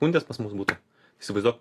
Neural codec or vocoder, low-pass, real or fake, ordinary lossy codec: none; 14.4 kHz; real; AAC, 48 kbps